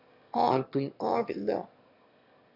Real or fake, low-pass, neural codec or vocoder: fake; 5.4 kHz; autoencoder, 22.05 kHz, a latent of 192 numbers a frame, VITS, trained on one speaker